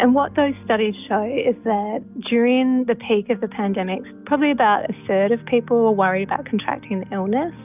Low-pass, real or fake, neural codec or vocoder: 3.6 kHz; real; none